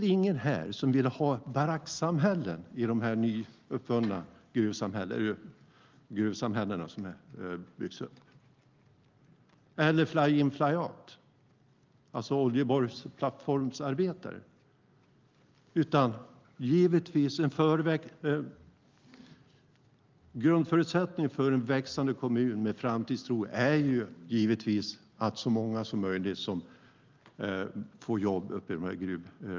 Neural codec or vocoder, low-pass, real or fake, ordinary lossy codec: none; 7.2 kHz; real; Opus, 32 kbps